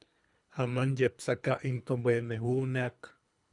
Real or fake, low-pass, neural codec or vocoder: fake; 10.8 kHz; codec, 24 kHz, 3 kbps, HILCodec